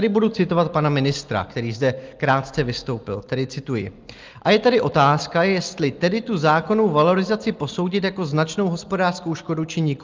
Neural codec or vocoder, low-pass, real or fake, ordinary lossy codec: none; 7.2 kHz; real; Opus, 32 kbps